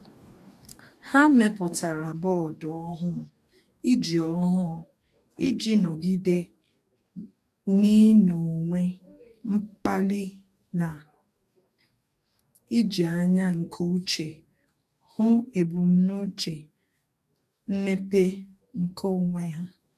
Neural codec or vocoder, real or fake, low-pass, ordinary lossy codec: codec, 44.1 kHz, 2.6 kbps, DAC; fake; 14.4 kHz; MP3, 96 kbps